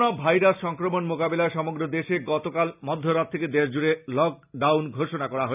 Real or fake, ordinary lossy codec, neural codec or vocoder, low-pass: real; none; none; 3.6 kHz